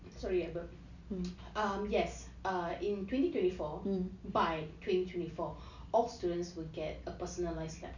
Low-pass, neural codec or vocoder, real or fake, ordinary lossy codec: 7.2 kHz; none; real; none